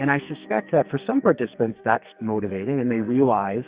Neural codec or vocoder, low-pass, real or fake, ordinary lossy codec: codec, 44.1 kHz, 2.6 kbps, SNAC; 3.6 kHz; fake; Opus, 64 kbps